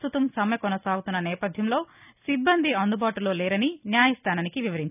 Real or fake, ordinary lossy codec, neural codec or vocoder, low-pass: real; none; none; 3.6 kHz